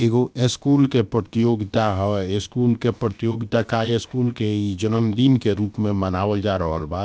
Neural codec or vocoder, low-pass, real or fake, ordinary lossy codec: codec, 16 kHz, about 1 kbps, DyCAST, with the encoder's durations; none; fake; none